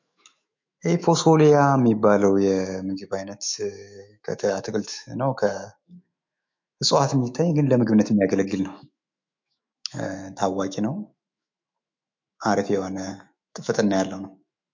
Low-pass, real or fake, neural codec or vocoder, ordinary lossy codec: 7.2 kHz; fake; autoencoder, 48 kHz, 128 numbers a frame, DAC-VAE, trained on Japanese speech; MP3, 48 kbps